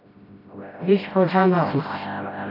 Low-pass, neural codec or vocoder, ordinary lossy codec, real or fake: 5.4 kHz; codec, 16 kHz, 0.5 kbps, FreqCodec, smaller model; AAC, 32 kbps; fake